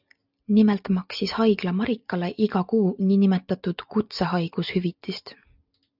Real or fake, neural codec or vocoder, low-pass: real; none; 5.4 kHz